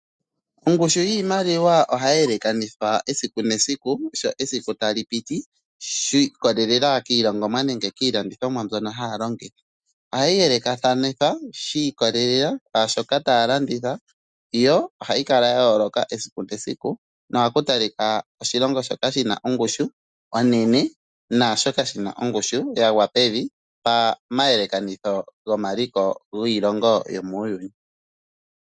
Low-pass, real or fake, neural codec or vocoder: 9.9 kHz; real; none